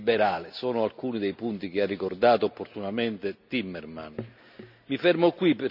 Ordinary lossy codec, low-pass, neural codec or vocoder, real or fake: none; 5.4 kHz; none; real